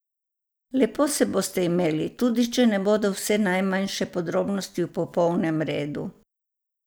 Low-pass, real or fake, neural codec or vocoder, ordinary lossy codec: none; real; none; none